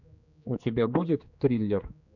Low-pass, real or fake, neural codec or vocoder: 7.2 kHz; fake; codec, 16 kHz, 2 kbps, X-Codec, HuBERT features, trained on general audio